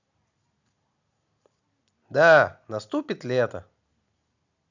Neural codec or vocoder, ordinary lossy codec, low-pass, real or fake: none; none; 7.2 kHz; real